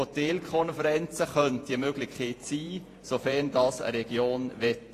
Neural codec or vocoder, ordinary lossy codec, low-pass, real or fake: vocoder, 48 kHz, 128 mel bands, Vocos; AAC, 48 kbps; 14.4 kHz; fake